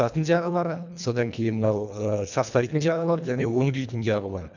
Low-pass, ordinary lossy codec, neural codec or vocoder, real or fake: 7.2 kHz; none; codec, 24 kHz, 1.5 kbps, HILCodec; fake